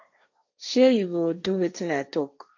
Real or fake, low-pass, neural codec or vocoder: fake; 7.2 kHz; codec, 16 kHz, 1.1 kbps, Voila-Tokenizer